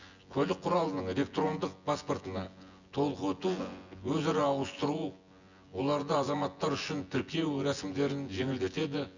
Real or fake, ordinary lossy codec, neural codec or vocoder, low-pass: fake; Opus, 64 kbps; vocoder, 24 kHz, 100 mel bands, Vocos; 7.2 kHz